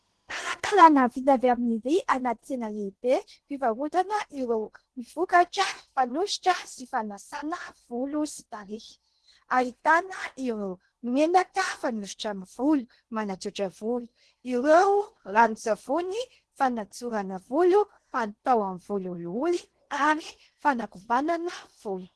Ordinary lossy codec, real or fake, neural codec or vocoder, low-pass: Opus, 16 kbps; fake; codec, 16 kHz in and 24 kHz out, 0.8 kbps, FocalCodec, streaming, 65536 codes; 10.8 kHz